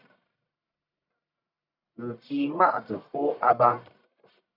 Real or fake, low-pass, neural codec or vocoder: fake; 5.4 kHz; codec, 44.1 kHz, 1.7 kbps, Pupu-Codec